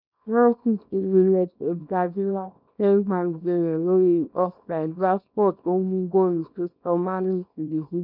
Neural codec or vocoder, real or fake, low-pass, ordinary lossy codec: codec, 24 kHz, 0.9 kbps, WavTokenizer, small release; fake; 5.4 kHz; none